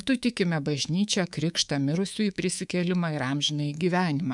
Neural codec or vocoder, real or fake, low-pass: codec, 24 kHz, 3.1 kbps, DualCodec; fake; 10.8 kHz